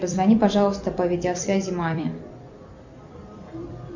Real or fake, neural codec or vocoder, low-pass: fake; vocoder, 44.1 kHz, 128 mel bands every 256 samples, BigVGAN v2; 7.2 kHz